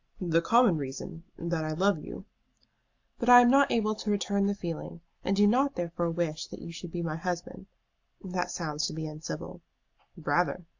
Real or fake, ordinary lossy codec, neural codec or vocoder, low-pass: real; AAC, 48 kbps; none; 7.2 kHz